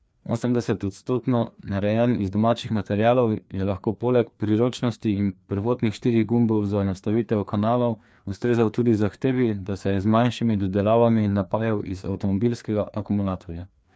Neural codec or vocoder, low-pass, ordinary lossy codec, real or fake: codec, 16 kHz, 2 kbps, FreqCodec, larger model; none; none; fake